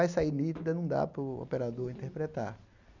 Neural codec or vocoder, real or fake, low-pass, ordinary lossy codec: none; real; 7.2 kHz; none